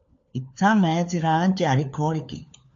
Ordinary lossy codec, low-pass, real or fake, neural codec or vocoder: MP3, 48 kbps; 7.2 kHz; fake; codec, 16 kHz, 4 kbps, FunCodec, trained on LibriTTS, 50 frames a second